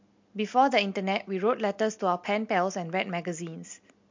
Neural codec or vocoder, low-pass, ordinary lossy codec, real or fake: none; 7.2 kHz; MP3, 48 kbps; real